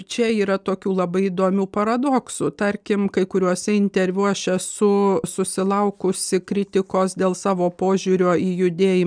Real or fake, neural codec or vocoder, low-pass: real; none; 9.9 kHz